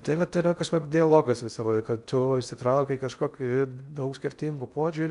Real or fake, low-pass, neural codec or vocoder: fake; 10.8 kHz; codec, 16 kHz in and 24 kHz out, 0.6 kbps, FocalCodec, streaming, 4096 codes